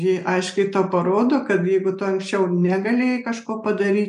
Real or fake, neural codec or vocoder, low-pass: real; none; 10.8 kHz